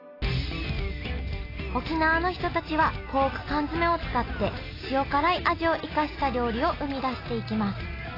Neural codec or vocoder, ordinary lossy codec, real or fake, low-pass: none; AAC, 24 kbps; real; 5.4 kHz